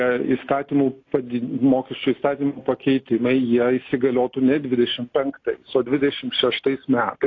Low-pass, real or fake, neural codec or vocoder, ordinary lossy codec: 7.2 kHz; real; none; AAC, 32 kbps